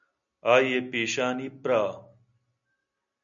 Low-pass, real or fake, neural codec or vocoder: 7.2 kHz; real; none